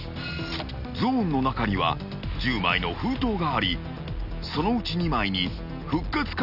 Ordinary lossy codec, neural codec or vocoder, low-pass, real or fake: none; none; 5.4 kHz; real